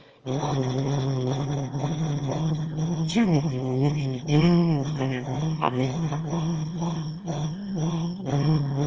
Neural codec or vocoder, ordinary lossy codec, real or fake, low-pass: autoencoder, 22.05 kHz, a latent of 192 numbers a frame, VITS, trained on one speaker; Opus, 24 kbps; fake; 7.2 kHz